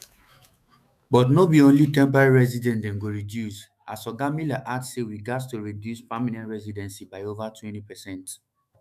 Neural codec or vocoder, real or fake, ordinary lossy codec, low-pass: autoencoder, 48 kHz, 128 numbers a frame, DAC-VAE, trained on Japanese speech; fake; none; 14.4 kHz